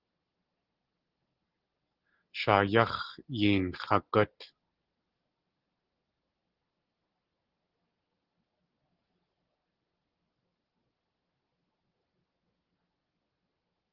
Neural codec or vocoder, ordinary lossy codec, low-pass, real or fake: none; Opus, 16 kbps; 5.4 kHz; real